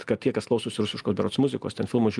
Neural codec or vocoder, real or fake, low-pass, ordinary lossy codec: none; real; 10.8 kHz; Opus, 32 kbps